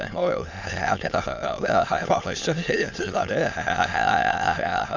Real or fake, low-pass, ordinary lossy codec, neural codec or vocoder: fake; 7.2 kHz; MP3, 64 kbps; autoencoder, 22.05 kHz, a latent of 192 numbers a frame, VITS, trained on many speakers